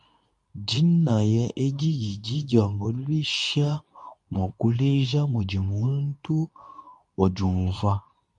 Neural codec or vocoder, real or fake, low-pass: codec, 24 kHz, 0.9 kbps, WavTokenizer, medium speech release version 2; fake; 10.8 kHz